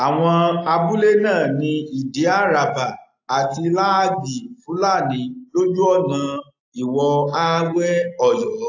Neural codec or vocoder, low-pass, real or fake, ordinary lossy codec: none; 7.2 kHz; real; AAC, 48 kbps